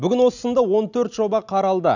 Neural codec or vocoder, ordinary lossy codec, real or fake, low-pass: none; none; real; 7.2 kHz